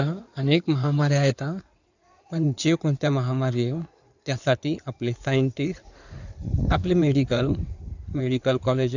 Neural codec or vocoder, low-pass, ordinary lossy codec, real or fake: codec, 16 kHz in and 24 kHz out, 2.2 kbps, FireRedTTS-2 codec; 7.2 kHz; none; fake